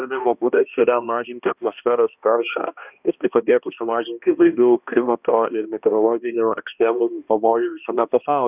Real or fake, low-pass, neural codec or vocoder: fake; 3.6 kHz; codec, 16 kHz, 1 kbps, X-Codec, HuBERT features, trained on balanced general audio